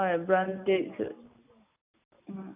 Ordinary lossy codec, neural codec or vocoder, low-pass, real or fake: AAC, 32 kbps; none; 3.6 kHz; real